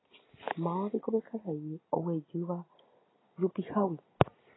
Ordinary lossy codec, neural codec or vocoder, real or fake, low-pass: AAC, 16 kbps; none; real; 7.2 kHz